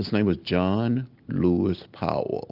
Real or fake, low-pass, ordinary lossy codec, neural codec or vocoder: real; 5.4 kHz; Opus, 24 kbps; none